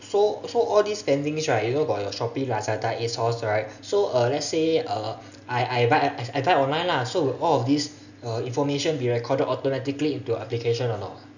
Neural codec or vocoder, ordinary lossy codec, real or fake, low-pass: none; none; real; 7.2 kHz